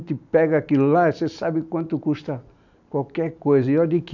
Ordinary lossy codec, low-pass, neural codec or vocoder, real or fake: none; 7.2 kHz; none; real